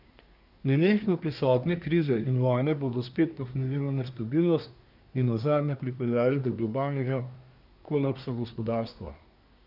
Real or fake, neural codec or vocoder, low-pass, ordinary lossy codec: fake; codec, 24 kHz, 1 kbps, SNAC; 5.4 kHz; none